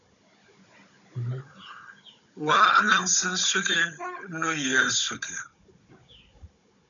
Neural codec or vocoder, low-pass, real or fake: codec, 16 kHz, 16 kbps, FunCodec, trained on Chinese and English, 50 frames a second; 7.2 kHz; fake